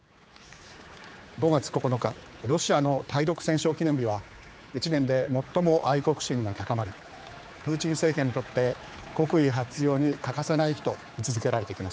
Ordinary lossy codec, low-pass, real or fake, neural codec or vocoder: none; none; fake; codec, 16 kHz, 4 kbps, X-Codec, HuBERT features, trained on general audio